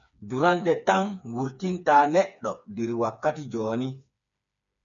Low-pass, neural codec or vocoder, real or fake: 7.2 kHz; codec, 16 kHz, 4 kbps, FreqCodec, smaller model; fake